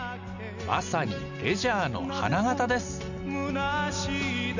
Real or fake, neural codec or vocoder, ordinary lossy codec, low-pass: real; none; none; 7.2 kHz